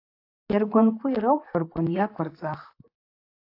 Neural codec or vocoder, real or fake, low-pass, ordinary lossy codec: vocoder, 44.1 kHz, 128 mel bands, Pupu-Vocoder; fake; 5.4 kHz; AAC, 32 kbps